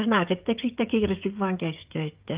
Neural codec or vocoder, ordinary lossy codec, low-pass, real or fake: codec, 16 kHz, 16 kbps, FreqCodec, smaller model; Opus, 32 kbps; 3.6 kHz; fake